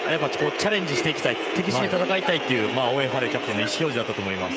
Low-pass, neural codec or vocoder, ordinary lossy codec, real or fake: none; codec, 16 kHz, 16 kbps, FreqCodec, smaller model; none; fake